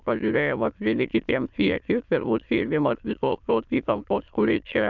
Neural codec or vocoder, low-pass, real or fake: autoencoder, 22.05 kHz, a latent of 192 numbers a frame, VITS, trained on many speakers; 7.2 kHz; fake